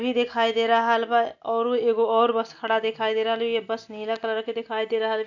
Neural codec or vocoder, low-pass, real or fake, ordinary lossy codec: none; 7.2 kHz; real; none